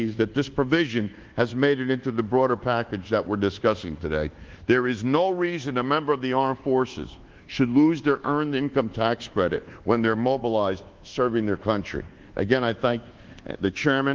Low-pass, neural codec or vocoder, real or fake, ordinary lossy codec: 7.2 kHz; codec, 24 kHz, 1.2 kbps, DualCodec; fake; Opus, 16 kbps